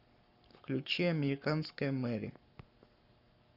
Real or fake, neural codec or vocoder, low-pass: fake; codec, 44.1 kHz, 7.8 kbps, Pupu-Codec; 5.4 kHz